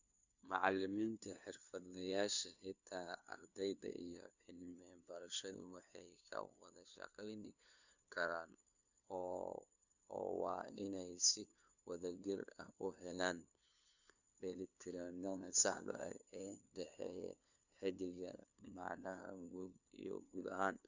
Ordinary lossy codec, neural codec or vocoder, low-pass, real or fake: Opus, 64 kbps; codec, 16 kHz, 2 kbps, FunCodec, trained on LibriTTS, 25 frames a second; 7.2 kHz; fake